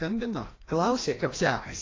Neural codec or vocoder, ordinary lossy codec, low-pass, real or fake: codec, 16 kHz, 1 kbps, FreqCodec, larger model; AAC, 32 kbps; 7.2 kHz; fake